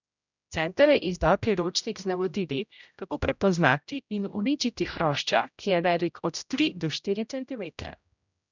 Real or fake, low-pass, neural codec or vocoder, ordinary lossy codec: fake; 7.2 kHz; codec, 16 kHz, 0.5 kbps, X-Codec, HuBERT features, trained on general audio; none